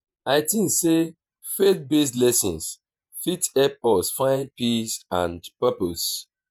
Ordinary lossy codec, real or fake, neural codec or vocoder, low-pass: none; fake; vocoder, 48 kHz, 128 mel bands, Vocos; none